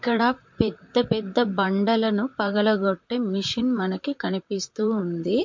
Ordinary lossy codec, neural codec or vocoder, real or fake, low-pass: MP3, 48 kbps; vocoder, 44.1 kHz, 128 mel bands, Pupu-Vocoder; fake; 7.2 kHz